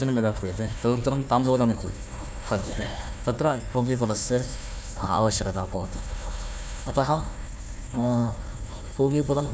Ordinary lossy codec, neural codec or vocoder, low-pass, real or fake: none; codec, 16 kHz, 1 kbps, FunCodec, trained on Chinese and English, 50 frames a second; none; fake